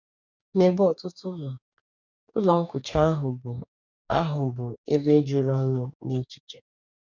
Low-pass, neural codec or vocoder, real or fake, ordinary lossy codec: 7.2 kHz; codec, 44.1 kHz, 2.6 kbps, DAC; fake; none